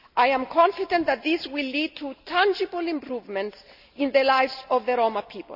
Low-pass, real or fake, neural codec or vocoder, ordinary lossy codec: 5.4 kHz; real; none; none